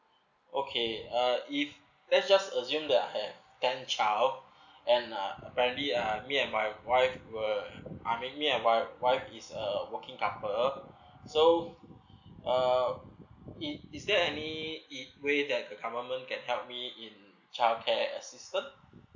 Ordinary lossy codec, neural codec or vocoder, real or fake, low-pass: none; none; real; 7.2 kHz